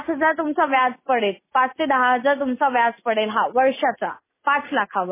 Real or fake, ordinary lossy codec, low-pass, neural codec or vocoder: real; MP3, 16 kbps; 3.6 kHz; none